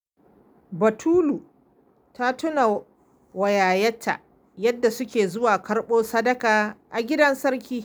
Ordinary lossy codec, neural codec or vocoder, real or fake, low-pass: none; none; real; none